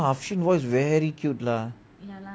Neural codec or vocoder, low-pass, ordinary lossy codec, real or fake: none; none; none; real